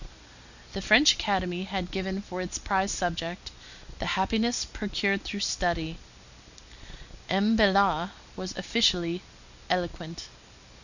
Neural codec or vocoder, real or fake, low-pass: none; real; 7.2 kHz